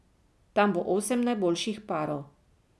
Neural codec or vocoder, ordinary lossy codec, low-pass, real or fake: none; none; none; real